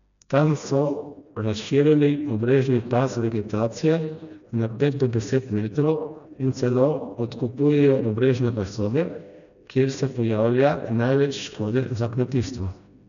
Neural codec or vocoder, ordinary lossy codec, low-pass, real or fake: codec, 16 kHz, 1 kbps, FreqCodec, smaller model; none; 7.2 kHz; fake